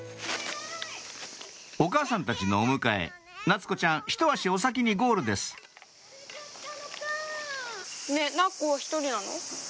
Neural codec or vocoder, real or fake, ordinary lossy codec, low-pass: none; real; none; none